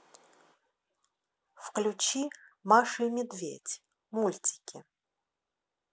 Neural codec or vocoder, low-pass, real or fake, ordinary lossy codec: none; none; real; none